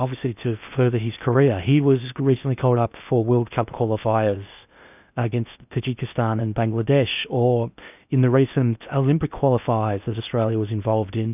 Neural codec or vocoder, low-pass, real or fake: codec, 16 kHz in and 24 kHz out, 0.8 kbps, FocalCodec, streaming, 65536 codes; 3.6 kHz; fake